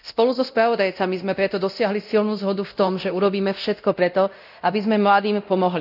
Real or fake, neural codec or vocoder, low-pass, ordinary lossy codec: fake; codec, 24 kHz, 0.9 kbps, DualCodec; 5.4 kHz; none